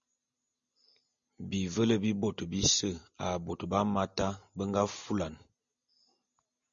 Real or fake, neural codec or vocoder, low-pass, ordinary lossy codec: real; none; 7.2 kHz; MP3, 64 kbps